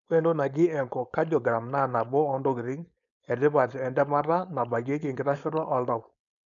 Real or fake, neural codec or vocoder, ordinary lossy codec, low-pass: fake; codec, 16 kHz, 4.8 kbps, FACodec; none; 7.2 kHz